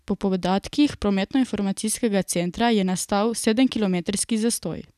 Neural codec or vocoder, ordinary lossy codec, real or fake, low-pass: none; none; real; 14.4 kHz